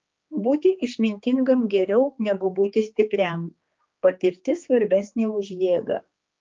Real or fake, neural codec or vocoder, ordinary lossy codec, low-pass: fake; codec, 16 kHz, 2 kbps, X-Codec, HuBERT features, trained on general audio; Opus, 24 kbps; 7.2 kHz